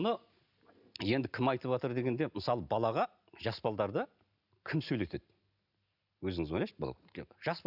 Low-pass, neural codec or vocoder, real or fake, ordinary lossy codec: 5.4 kHz; none; real; none